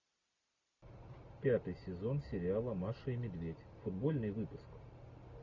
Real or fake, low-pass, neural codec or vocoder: real; 7.2 kHz; none